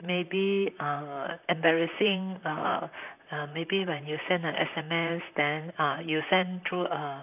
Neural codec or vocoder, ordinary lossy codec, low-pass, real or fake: vocoder, 44.1 kHz, 128 mel bands, Pupu-Vocoder; none; 3.6 kHz; fake